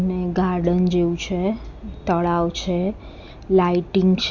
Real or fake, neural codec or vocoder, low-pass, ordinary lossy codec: real; none; 7.2 kHz; Opus, 64 kbps